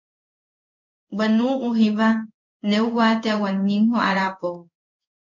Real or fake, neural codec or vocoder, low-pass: fake; codec, 16 kHz in and 24 kHz out, 1 kbps, XY-Tokenizer; 7.2 kHz